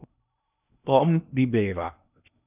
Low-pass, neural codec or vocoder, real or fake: 3.6 kHz; codec, 16 kHz in and 24 kHz out, 0.8 kbps, FocalCodec, streaming, 65536 codes; fake